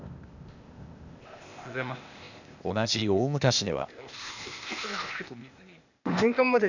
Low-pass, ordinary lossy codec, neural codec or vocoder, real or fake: 7.2 kHz; none; codec, 16 kHz, 0.8 kbps, ZipCodec; fake